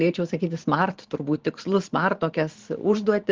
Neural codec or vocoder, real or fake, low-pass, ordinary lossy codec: vocoder, 44.1 kHz, 128 mel bands every 512 samples, BigVGAN v2; fake; 7.2 kHz; Opus, 16 kbps